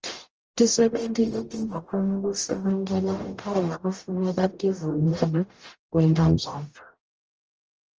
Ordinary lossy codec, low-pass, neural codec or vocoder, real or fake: Opus, 24 kbps; 7.2 kHz; codec, 44.1 kHz, 0.9 kbps, DAC; fake